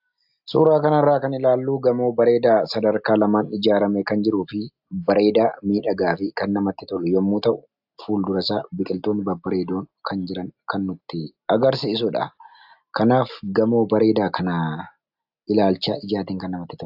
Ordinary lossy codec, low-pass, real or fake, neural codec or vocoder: AAC, 48 kbps; 5.4 kHz; real; none